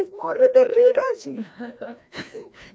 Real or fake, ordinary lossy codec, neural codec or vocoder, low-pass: fake; none; codec, 16 kHz, 1 kbps, FreqCodec, larger model; none